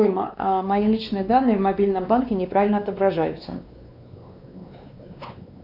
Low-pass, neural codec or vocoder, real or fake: 5.4 kHz; codec, 16 kHz, 2 kbps, X-Codec, WavLM features, trained on Multilingual LibriSpeech; fake